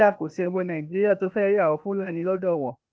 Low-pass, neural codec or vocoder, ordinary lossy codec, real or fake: none; codec, 16 kHz, 0.8 kbps, ZipCodec; none; fake